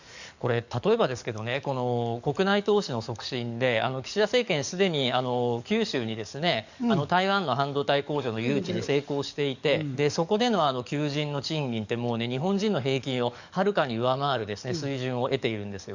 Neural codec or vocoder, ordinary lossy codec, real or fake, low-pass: codec, 44.1 kHz, 7.8 kbps, DAC; none; fake; 7.2 kHz